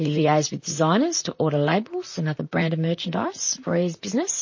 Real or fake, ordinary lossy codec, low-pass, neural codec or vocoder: fake; MP3, 32 kbps; 7.2 kHz; vocoder, 44.1 kHz, 128 mel bands, Pupu-Vocoder